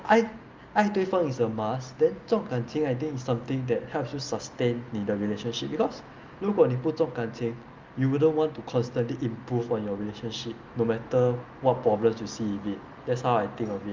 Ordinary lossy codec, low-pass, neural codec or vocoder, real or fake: Opus, 24 kbps; 7.2 kHz; none; real